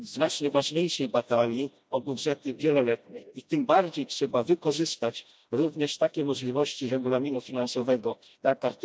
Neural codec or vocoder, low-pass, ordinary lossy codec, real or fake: codec, 16 kHz, 1 kbps, FreqCodec, smaller model; none; none; fake